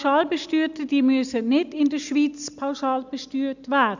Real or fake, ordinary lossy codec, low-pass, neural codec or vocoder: real; none; 7.2 kHz; none